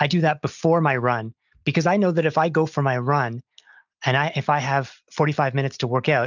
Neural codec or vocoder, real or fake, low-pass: none; real; 7.2 kHz